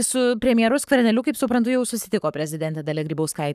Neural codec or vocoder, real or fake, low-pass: codec, 44.1 kHz, 7.8 kbps, Pupu-Codec; fake; 14.4 kHz